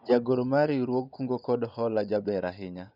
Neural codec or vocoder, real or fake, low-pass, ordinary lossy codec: vocoder, 24 kHz, 100 mel bands, Vocos; fake; 5.4 kHz; none